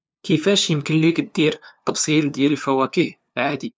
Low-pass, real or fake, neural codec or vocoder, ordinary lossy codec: none; fake; codec, 16 kHz, 2 kbps, FunCodec, trained on LibriTTS, 25 frames a second; none